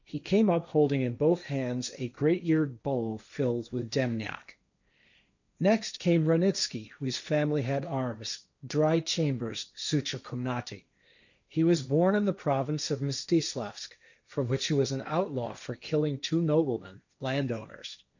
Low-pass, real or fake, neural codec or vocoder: 7.2 kHz; fake; codec, 16 kHz, 1.1 kbps, Voila-Tokenizer